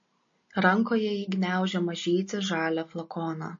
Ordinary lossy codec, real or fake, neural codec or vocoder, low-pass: MP3, 32 kbps; real; none; 7.2 kHz